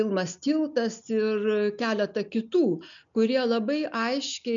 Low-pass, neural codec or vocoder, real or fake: 7.2 kHz; none; real